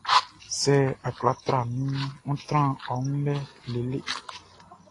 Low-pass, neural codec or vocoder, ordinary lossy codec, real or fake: 10.8 kHz; none; AAC, 32 kbps; real